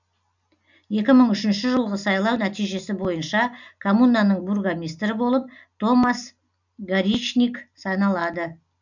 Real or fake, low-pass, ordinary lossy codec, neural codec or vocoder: real; 7.2 kHz; none; none